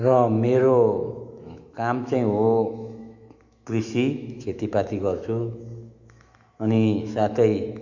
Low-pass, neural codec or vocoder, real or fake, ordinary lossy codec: 7.2 kHz; autoencoder, 48 kHz, 128 numbers a frame, DAC-VAE, trained on Japanese speech; fake; Opus, 64 kbps